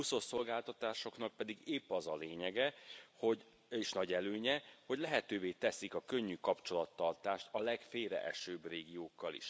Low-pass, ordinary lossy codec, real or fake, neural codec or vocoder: none; none; real; none